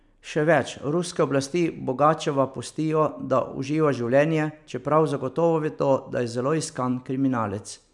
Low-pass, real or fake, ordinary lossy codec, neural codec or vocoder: 10.8 kHz; real; none; none